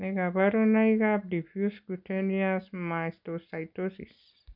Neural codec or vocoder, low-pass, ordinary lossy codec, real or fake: none; 5.4 kHz; none; real